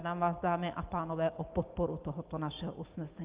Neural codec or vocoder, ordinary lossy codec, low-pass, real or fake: none; Opus, 24 kbps; 3.6 kHz; real